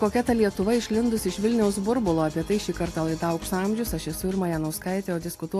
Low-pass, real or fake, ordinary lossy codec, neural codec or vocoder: 14.4 kHz; real; AAC, 64 kbps; none